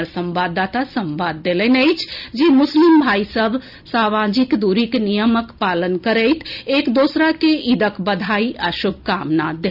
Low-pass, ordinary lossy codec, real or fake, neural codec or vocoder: 5.4 kHz; none; real; none